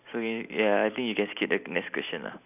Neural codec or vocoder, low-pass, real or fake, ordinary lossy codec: none; 3.6 kHz; real; none